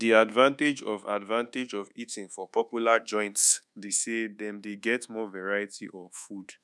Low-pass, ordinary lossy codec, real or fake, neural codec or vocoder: none; none; fake; codec, 24 kHz, 1.2 kbps, DualCodec